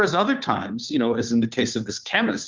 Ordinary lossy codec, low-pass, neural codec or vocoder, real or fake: Opus, 24 kbps; 7.2 kHz; codec, 16 kHz, 2 kbps, FunCodec, trained on Chinese and English, 25 frames a second; fake